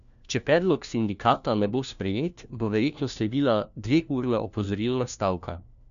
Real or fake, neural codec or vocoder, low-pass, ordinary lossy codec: fake; codec, 16 kHz, 1 kbps, FunCodec, trained on LibriTTS, 50 frames a second; 7.2 kHz; none